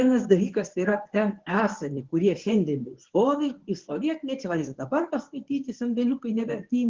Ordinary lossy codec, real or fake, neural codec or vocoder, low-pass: Opus, 16 kbps; fake; codec, 24 kHz, 0.9 kbps, WavTokenizer, medium speech release version 1; 7.2 kHz